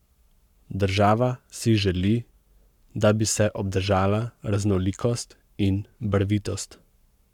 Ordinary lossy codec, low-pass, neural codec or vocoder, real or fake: none; 19.8 kHz; codec, 44.1 kHz, 7.8 kbps, Pupu-Codec; fake